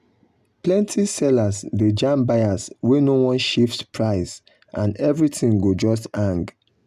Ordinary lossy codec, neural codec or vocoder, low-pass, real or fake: none; none; 14.4 kHz; real